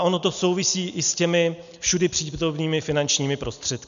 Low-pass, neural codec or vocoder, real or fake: 7.2 kHz; none; real